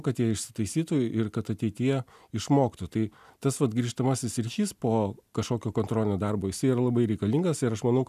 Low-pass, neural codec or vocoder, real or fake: 14.4 kHz; none; real